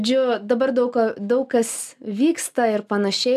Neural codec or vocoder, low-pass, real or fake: none; 14.4 kHz; real